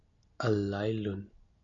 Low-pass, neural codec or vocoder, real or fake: 7.2 kHz; none; real